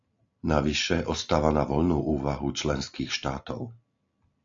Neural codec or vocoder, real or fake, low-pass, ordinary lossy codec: none; real; 7.2 kHz; AAC, 64 kbps